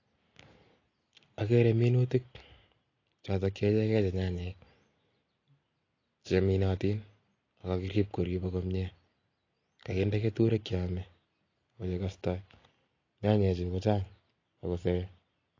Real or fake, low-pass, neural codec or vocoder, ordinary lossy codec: real; 7.2 kHz; none; AAC, 32 kbps